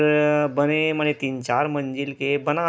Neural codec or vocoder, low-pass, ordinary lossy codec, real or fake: none; none; none; real